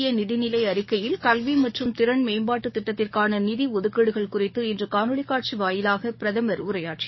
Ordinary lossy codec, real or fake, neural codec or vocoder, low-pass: MP3, 24 kbps; fake; codec, 44.1 kHz, 7.8 kbps, DAC; 7.2 kHz